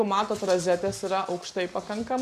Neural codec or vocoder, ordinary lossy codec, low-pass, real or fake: none; Opus, 64 kbps; 14.4 kHz; real